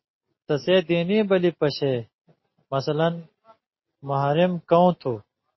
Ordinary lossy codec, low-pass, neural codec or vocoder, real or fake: MP3, 24 kbps; 7.2 kHz; none; real